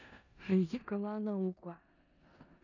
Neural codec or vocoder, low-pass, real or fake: codec, 16 kHz in and 24 kHz out, 0.4 kbps, LongCat-Audio-Codec, four codebook decoder; 7.2 kHz; fake